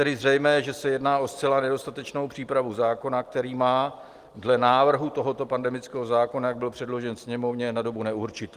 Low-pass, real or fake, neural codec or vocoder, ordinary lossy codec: 14.4 kHz; real; none; Opus, 24 kbps